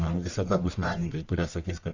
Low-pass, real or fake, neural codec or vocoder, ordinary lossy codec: 7.2 kHz; fake; codec, 44.1 kHz, 1.7 kbps, Pupu-Codec; Opus, 64 kbps